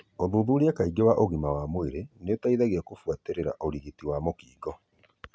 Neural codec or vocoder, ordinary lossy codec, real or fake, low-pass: none; none; real; none